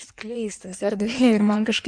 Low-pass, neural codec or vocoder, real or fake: 9.9 kHz; codec, 16 kHz in and 24 kHz out, 1.1 kbps, FireRedTTS-2 codec; fake